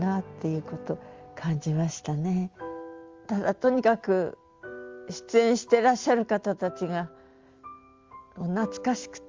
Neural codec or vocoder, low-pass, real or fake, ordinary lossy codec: none; 7.2 kHz; real; Opus, 32 kbps